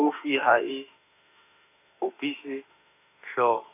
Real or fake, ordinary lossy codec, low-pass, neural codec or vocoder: fake; none; 3.6 kHz; autoencoder, 48 kHz, 32 numbers a frame, DAC-VAE, trained on Japanese speech